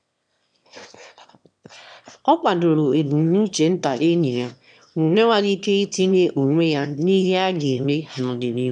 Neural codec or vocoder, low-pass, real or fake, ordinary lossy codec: autoencoder, 22.05 kHz, a latent of 192 numbers a frame, VITS, trained on one speaker; 9.9 kHz; fake; none